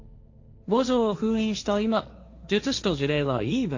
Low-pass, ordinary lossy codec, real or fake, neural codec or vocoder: 7.2 kHz; AAC, 48 kbps; fake; codec, 16 kHz, 1.1 kbps, Voila-Tokenizer